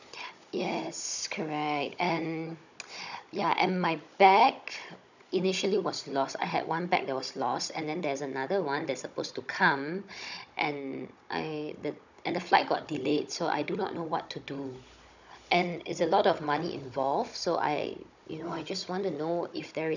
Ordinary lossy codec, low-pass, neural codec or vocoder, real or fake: none; 7.2 kHz; codec, 16 kHz, 16 kbps, FunCodec, trained on Chinese and English, 50 frames a second; fake